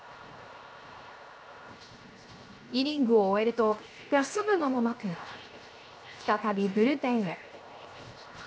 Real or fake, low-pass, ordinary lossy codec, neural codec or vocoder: fake; none; none; codec, 16 kHz, 0.7 kbps, FocalCodec